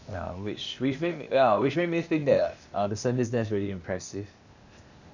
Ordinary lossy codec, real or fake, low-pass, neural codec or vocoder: none; fake; 7.2 kHz; codec, 16 kHz, 0.8 kbps, ZipCodec